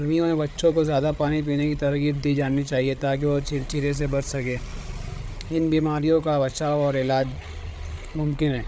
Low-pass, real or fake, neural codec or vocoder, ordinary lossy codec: none; fake; codec, 16 kHz, 8 kbps, FreqCodec, larger model; none